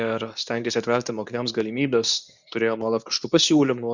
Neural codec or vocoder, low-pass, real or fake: codec, 24 kHz, 0.9 kbps, WavTokenizer, medium speech release version 2; 7.2 kHz; fake